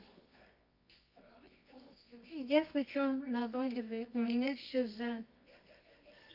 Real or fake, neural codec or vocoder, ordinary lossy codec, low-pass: fake; codec, 24 kHz, 0.9 kbps, WavTokenizer, medium music audio release; none; 5.4 kHz